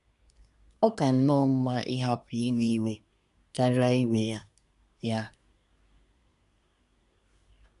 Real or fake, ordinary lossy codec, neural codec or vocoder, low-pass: fake; none; codec, 24 kHz, 1 kbps, SNAC; 10.8 kHz